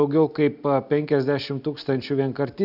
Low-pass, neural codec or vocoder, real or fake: 5.4 kHz; none; real